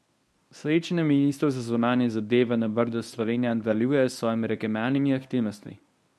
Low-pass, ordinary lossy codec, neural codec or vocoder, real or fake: none; none; codec, 24 kHz, 0.9 kbps, WavTokenizer, medium speech release version 1; fake